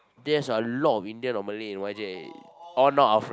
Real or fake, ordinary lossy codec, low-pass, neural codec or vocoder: real; none; none; none